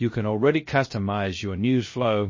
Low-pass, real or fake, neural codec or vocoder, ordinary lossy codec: 7.2 kHz; fake; codec, 16 kHz, 0.3 kbps, FocalCodec; MP3, 32 kbps